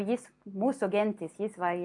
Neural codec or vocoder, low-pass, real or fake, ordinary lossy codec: none; 10.8 kHz; real; AAC, 64 kbps